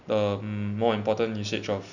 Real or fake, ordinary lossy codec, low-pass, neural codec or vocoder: real; none; 7.2 kHz; none